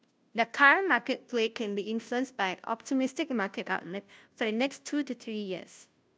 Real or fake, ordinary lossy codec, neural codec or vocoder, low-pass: fake; none; codec, 16 kHz, 0.5 kbps, FunCodec, trained on Chinese and English, 25 frames a second; none